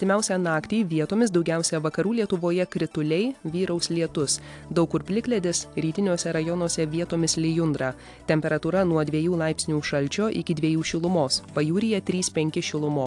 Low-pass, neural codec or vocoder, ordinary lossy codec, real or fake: 10.8 kHz; none; AAC, 64 kbps; real